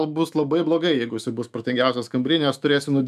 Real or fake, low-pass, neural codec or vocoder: fake; 14.4 kHz; autoencoder, 48 kHz, 128 numbers a frame, DAC-VAE, trained on Japanese speech